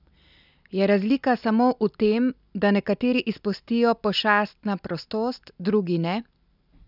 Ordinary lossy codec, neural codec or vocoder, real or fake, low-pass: none; none; real; 5.4 kHz